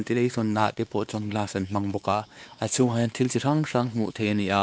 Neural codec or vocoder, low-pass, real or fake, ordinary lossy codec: codec, 16 kHz, 2 kbps, X-Codec, WavLM features, trained on Multilingual LibriSpeech; none; fake; none